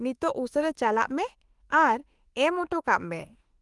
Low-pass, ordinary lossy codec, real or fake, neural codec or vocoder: 10.8 kHz; Opus, 24 kbps; fake; autoencoder, 48 kHz, 32 numbers a frame, DAC-VAE, trained on Japanese speech